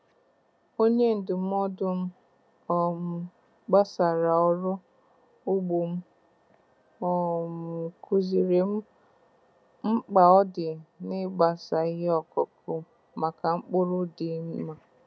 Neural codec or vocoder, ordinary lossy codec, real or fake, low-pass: none; none; real; none